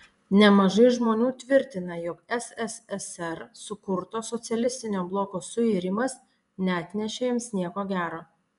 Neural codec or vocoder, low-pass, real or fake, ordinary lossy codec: vocoder, 24 kHz, 100 mel bands, Vocos; 10.8 kHz; fake; MP3, 96 kbps